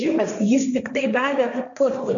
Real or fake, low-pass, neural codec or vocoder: fake; 7.2 kHz; codec, 16 kHz, 1.1 kbps, Voila-Tokenizer